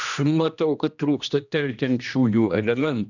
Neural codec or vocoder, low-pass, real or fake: codec, 16 kHz, 1 kbps, X-Codec, HuBERT features, trained on general audio; 7.2 kHz; fake